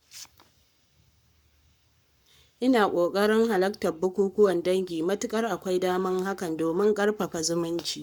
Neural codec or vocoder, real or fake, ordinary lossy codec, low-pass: codec, 44.1 kHz, 7.8 kbps, Pupu-Codec; fake; none; 19.8 kHz